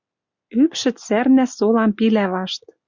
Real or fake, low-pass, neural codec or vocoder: real; 7.2 kHz; none